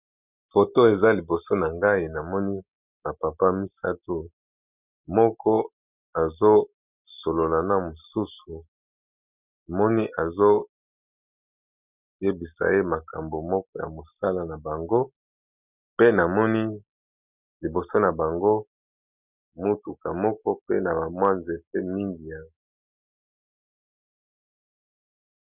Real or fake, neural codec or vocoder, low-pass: real; none; 3.6 kHz